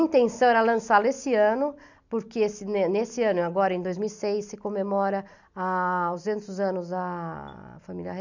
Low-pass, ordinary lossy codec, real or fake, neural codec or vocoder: 7.2 kHz; none; real; none